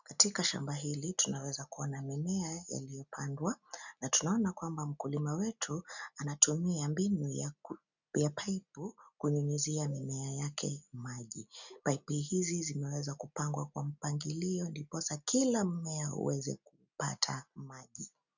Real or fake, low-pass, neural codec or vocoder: real; 7.2 kHz; none